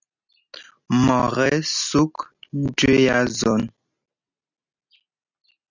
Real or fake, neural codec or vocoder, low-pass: real; none; 7.2 kHz